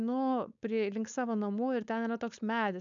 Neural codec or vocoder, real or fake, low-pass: codec, 16 kHz, 4.8 kbps, FACodec; fake; 7.2 kHz